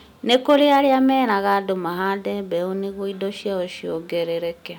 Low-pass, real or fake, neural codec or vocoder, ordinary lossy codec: 19.8 kHz; real; none; none